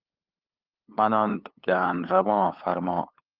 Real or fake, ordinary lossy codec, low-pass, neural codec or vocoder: fake; Opus, 32 kbps; 5.4 kHz; codec, 16 kHz, 8 kbps, FunCodec, trained on LibriTTS, 25 frames a second